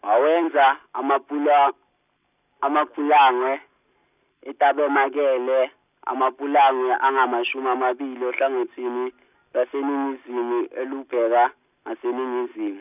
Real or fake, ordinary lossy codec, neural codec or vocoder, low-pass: real; none; none; 3.6 kHz